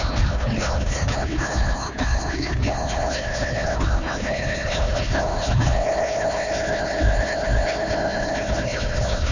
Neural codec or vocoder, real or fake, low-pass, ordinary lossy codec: codec, 16 kHz, 1 kbps, FunCodec, trained on Chinese and English, 50 frames a second; fake; 7.2 kHz; none